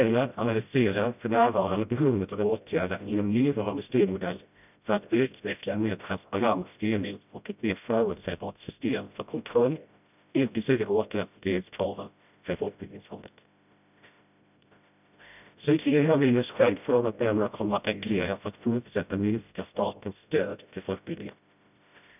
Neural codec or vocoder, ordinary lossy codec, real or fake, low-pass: codec, 16 kHz, 0.5 kbps, FreqCodec, smaller model; none; fake; 3.6 kHz